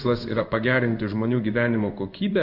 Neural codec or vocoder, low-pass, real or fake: codec, 16 kHz in and 24 kHz out, 1 kbps, XY-Tokenizer; 5.4 kHz; fake